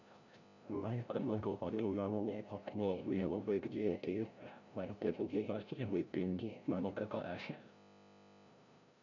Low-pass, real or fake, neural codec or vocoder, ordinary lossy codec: 7.2 kHz; fake; codec, 16 kHz, 0.5 kbps, FreqCodec, larger model; none